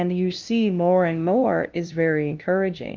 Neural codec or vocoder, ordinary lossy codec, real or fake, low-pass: codec, 16 kHz, 0.5 kbps, FunCodec, trained on LibriTTS, 25 frames a second; Opus, 32 kbps; fake; 7.2 kHz